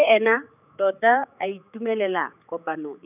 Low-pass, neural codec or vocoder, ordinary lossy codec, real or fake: 3.6 kHz; codec, 16 kHz, 4 kbps, X-Codec, HuBERT features, trained on general audio; none; fake